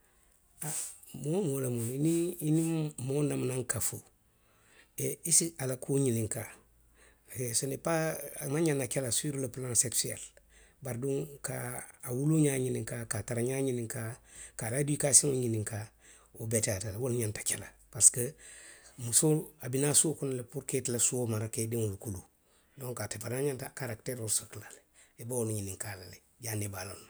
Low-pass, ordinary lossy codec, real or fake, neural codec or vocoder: none; none; real; none